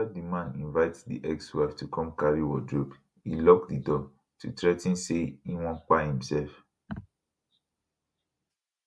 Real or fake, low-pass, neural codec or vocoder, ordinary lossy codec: real; none; none; none